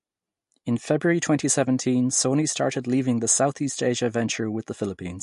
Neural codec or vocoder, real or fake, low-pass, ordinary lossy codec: none; real; 14.4 kHz; MP3, 48 kbps